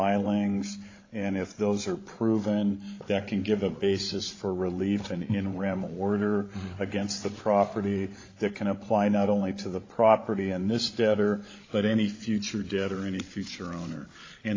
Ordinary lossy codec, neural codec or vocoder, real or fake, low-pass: AAC, 32 kbps; codec, 16 kHz, 16 kbps, FreqCodec, larger model; fake; 7.2 kHz